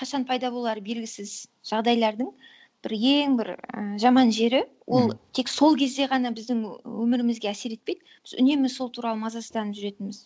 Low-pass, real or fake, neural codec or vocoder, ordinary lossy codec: none; real; none; none